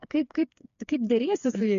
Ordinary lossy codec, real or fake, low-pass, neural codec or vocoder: MP3, 64 kbps; fake; 7.2 kHz; codec, 16 kHz, 4 kbps, FreqCodec, smaller model